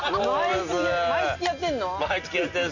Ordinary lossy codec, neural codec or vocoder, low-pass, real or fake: none; none; 7.2 kHz; real